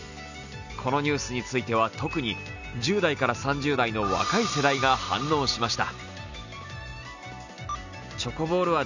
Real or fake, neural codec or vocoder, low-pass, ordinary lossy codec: real; none; 7.2 kHz; none